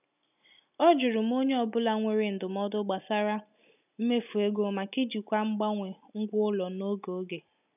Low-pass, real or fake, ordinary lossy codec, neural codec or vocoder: 3.6 kHz; real; none; none